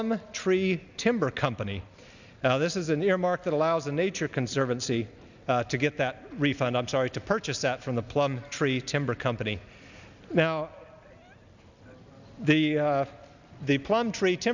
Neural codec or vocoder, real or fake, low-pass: none; real; 7.2 kHz